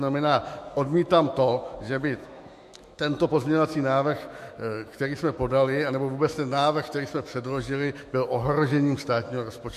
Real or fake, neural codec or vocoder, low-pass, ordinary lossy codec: fake; autoencoder, 48 kHz, 128 numbers a frame, DAC-VAE, trained on Japanese speech; 14.4 kHz; MP3, 64 kbps